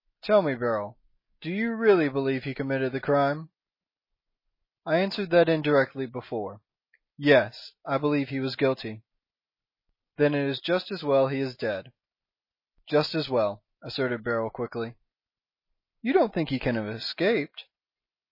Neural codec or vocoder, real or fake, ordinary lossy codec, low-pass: none; real; MP3, 24 kbps; 5.4 kHz